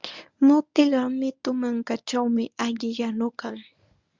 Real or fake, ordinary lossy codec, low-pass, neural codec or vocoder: fake; Opus, 64 kbps; 7.2 kHz; codec, 24 kHz, 0.9 kbps, WavTokenizer, medium speech release version 1